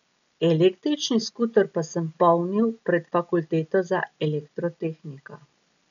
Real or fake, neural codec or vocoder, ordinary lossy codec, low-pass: real; none; none; 7.2 kHz